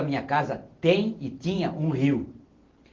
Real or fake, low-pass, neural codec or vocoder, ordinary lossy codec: real; 7.2 kHz; none; Opus, 16 kbps